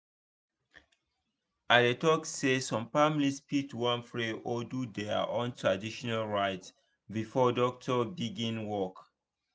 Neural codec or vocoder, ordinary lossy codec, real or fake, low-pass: none; none; real; none